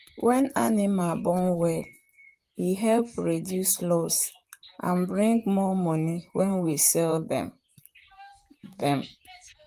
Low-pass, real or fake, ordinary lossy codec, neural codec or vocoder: 14.4 kHz; fake; Opus, 32 kbps; vocoder, 44.1 kHz, 128 mel bands, Pupu-Vocoder